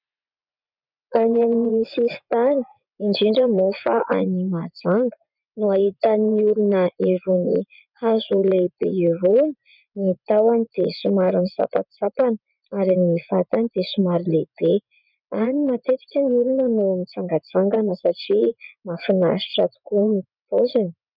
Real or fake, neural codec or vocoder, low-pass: fake; vocoder, 24 kHz, 100 mel bands, Vocos; 5.4 kHz